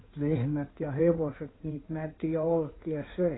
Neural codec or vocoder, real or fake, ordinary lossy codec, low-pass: codec, 16 kHz in and 24 kHz out, 2.2 kbps, FireRedTTS-2 codec; fake; AAC, 16 kbps; 7.2 kHz